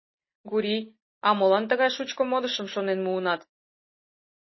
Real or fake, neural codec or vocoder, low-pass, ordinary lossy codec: real; none; 7.2 kHz; MP3, 24 kbps